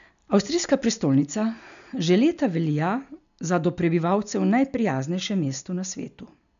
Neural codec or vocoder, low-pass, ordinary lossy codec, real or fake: none; 7.2 kHz; none; real